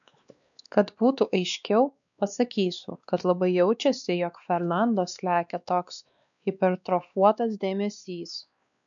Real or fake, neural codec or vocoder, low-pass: fake; codec, 16 kHz, 2 kbps, X-Codec, WavLM features, trained on Multilingual LibriSpeech; 7.2 kHz